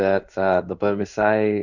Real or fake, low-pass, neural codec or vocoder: fake; 7.2 kHz; codec, 16 kHz in and 24 kHz out, 1 kbps, XY-Tokenizer